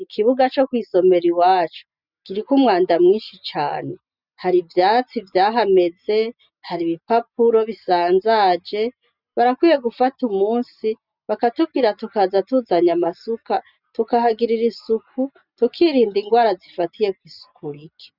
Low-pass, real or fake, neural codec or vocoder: 5.4 kHz; real; none